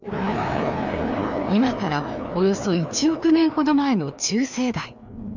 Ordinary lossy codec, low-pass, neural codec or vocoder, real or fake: none; 7.2 kHz; codec, 16 kHz, 2 kbps, FreqCodec, larger model; fake